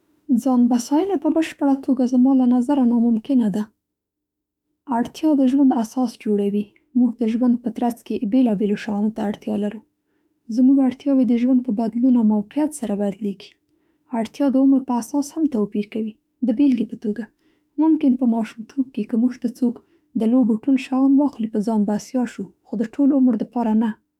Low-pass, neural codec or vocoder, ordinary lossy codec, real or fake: 19.8 kHz; autoencoder, 48 kHz, 32 numbers a frame, DAC-VAE, trained on Japanese speech; none; fake